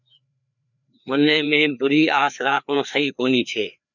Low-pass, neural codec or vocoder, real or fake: 7.2 kHz; codec, 16 kHz, 2 kbps, FreqCodec, larger model; fake